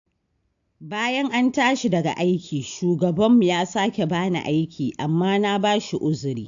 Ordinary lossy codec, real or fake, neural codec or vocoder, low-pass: AAC, 64 kbps; real; none; 7.2 kHz